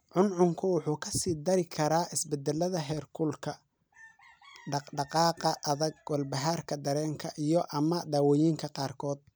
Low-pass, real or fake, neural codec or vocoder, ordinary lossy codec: none; real; none; none